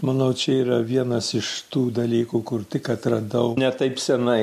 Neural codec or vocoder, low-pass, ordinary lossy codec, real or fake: none; 14.4 kHz; MP3, 64 kbps; real